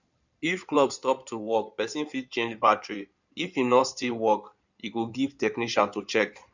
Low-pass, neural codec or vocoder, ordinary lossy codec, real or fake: 7.2 kHz; codec, 16 kHz in and 24 kHz out, 2.2 kbps, FireRedTTS-2 codec; none; fake